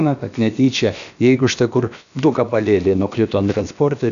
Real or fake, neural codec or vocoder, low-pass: fake; codec, 16 kHz, about 1 kbps, DyCAST, with the encoder's durations; 7.2 kHz